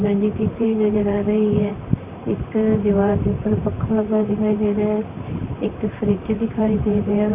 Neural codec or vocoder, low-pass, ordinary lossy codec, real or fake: vocoder, 44.1 kHz, 128 mel bands, Pupu-Vocoder; 3.6 kHz; Opus, 64 kbps; fake